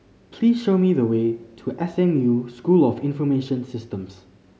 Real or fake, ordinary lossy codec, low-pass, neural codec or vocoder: real; none; none; none